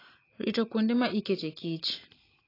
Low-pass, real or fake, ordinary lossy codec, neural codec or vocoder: 5.4 kHz; real; AAC, 24 kbps; none